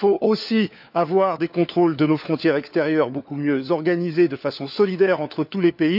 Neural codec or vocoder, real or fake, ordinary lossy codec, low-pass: codec, 16 kHz, 4 kbps, FunCodec, trained on LibriTTS, 50 frames a second; fake; none; 5.4 kHz